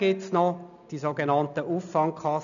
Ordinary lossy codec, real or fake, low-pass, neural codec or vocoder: none; real; 7.2 kHz; none